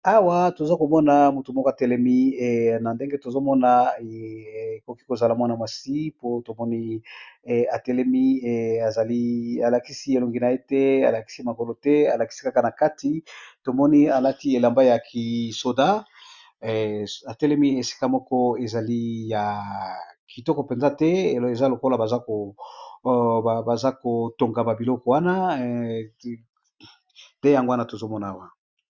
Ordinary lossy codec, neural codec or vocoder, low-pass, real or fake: Opus, 64 kbps; none; 7.2 kHz; real